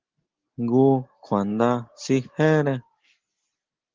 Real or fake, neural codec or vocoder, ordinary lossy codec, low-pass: real; none; Opus, 16 kbps; 7.2 kHz